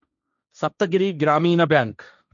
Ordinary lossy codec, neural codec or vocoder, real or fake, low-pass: none; codec, 16 kHz, 1.1 kbps, Voila-Tokenizer; fake; 7.2 kHz